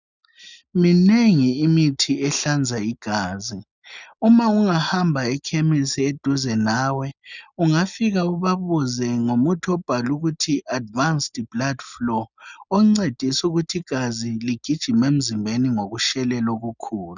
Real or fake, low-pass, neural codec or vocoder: real; 7.2 kHz; none